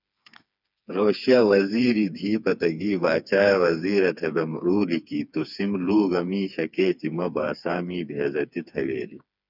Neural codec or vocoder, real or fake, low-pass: codec, 16 kHz, 4 kbps, FreqCodec, smaller model; fake; 5.4 kHz